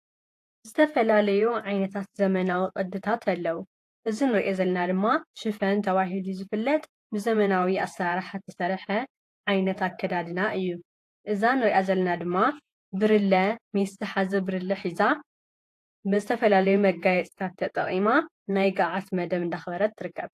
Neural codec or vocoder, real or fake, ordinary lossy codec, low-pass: vocoder, 48 kHz, 128 mel bands, Vocos; fake; AAC, 64 kbps; 14.4 kHz